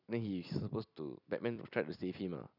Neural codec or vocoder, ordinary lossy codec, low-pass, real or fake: vocoder, 44.1 kHz, 128 mel bands every 256 samples, BigVGAN v2; none; 5.4 kHz; fake